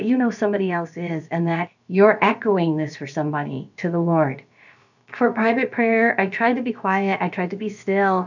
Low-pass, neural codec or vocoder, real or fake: 7.2 kHz; codec, 16 kHz, about 1 kbps, DyCAST, with the encoder's durations; fake